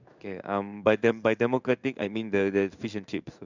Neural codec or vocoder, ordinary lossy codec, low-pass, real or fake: codec, 16 kHz in and 24 kHz out, 1 kbps, XY-Tokenizer; none; 7.2 kHz; fake